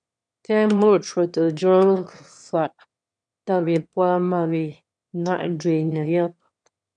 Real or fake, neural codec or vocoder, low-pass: fake; autoencoder, 22.05 kHz, a latent of 192 numbers a frame, VITS, trained on one speaker; 9.9 kHz